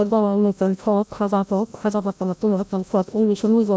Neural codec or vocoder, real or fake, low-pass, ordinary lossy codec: codec, 16 kHz, 0.5 kbps, FreqCodec, larger model; fake; none; none